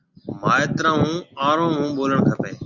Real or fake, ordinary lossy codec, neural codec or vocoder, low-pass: real; Opus, 64 kbps; none; 7.2 kHz